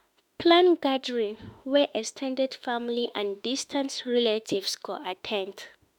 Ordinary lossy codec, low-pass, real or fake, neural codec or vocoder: none; 19.8 kHz; fake; autoencoder, 48 kHz, 32 numbers a frame, DAC-VAE, trained on Japanese speech